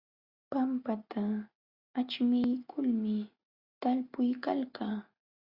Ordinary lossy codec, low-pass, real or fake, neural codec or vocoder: Opus, 64 kbps; 5.4 kHz; real; none